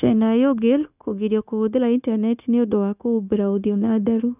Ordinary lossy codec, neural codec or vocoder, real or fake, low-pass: none; codec, 16 kHz, 0.9 kbps, LongCat-Audio-Codec; fake; 3.6 kHz